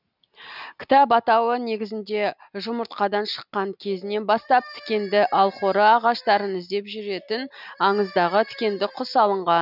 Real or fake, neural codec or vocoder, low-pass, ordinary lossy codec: real; none; 5.4 kHz; none